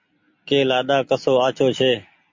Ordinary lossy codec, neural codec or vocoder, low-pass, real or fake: MP3, 48 kbps; none; 7.2 kHz; real